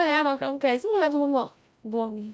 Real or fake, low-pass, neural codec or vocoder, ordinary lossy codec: fake; none; codec, 16 kHz, 0.5 kbps, FreqCodec, larger model; none